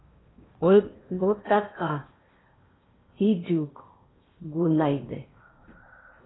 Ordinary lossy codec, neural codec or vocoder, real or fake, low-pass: AAC, 16 kbps; codec, 16 kHz in and 24 kHz out, 0.8 kbps, FocalCodec, streaming, 65536 codes; fake; 7.2 kHz